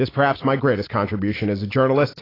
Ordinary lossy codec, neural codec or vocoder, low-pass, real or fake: AAC, 24 kbps; none; 5.4 kHz; real